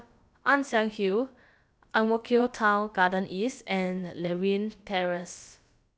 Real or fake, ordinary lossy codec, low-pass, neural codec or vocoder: fake; none; none; codec, 16 kHz, about 1 kbps, DyCAST, with the encoder's durations